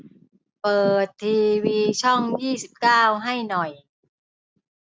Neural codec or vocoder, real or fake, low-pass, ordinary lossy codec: none; real; none; none